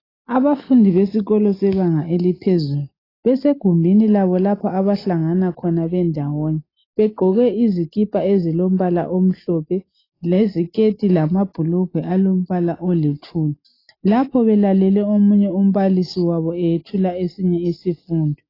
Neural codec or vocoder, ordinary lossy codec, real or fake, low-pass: none; AAC, 24 kbps; real; 5.4 kHz